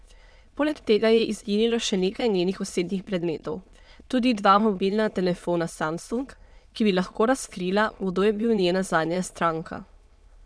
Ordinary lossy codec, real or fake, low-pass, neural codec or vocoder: none; fake; none; autoencoder, 22.05 kHz, a latent of 192 numbers a frame, VITS, trained on many speakers